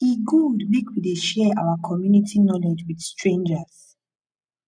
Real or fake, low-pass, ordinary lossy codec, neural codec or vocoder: real; 9.9 kHz; none; none